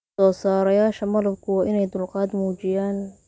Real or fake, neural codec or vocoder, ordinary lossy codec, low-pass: real; none; none; none